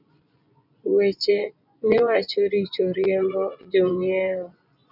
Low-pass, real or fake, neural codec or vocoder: 5.4 kHz; fake; vocoder, 24 kHz, 100 mel bands, Vocos